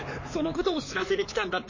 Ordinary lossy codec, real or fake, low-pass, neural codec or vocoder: MP3, 32 kbps; fake; 7.2 kHz; codec, 16 kHz, 4 kbps, X-Codec, HuBERT features, trained on LibriSpeech